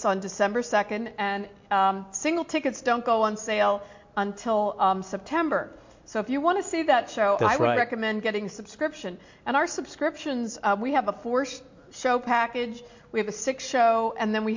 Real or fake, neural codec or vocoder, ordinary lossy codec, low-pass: real; none; MP3, 48 kbps; 7.2 kHz